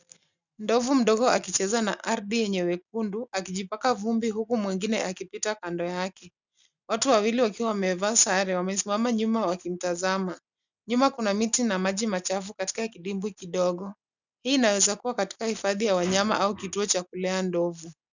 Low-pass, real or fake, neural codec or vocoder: 7.2 kHz; real; none